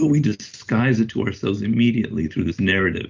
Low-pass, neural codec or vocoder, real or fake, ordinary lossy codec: 7.2 kHz; none; real; Opus, 32 kbps